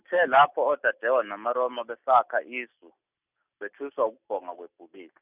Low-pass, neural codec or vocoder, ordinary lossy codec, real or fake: 3.6 kHz; none; none; real